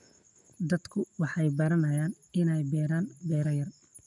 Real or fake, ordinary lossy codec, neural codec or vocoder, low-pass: real; none; none; 10.8 kHz